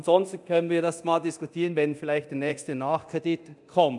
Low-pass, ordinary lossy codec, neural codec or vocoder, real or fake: 10.8 kHz; none; codec, 24 kHz, 0.9 kbps, DualCodec; fake